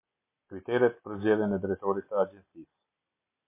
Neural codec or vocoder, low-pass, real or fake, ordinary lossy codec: none; 3.6 kHz; real; MP3, 24 kbps